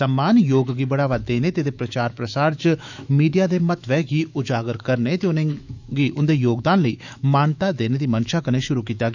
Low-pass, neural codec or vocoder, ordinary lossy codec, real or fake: 7.2 kHz; autoencoder, 48 kHz, 128 numbers a frame, DAC-VAE, trained on Japanese speech; none; fake